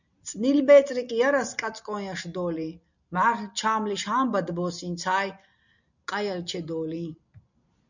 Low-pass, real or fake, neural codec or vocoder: 7.2 kHz; real; none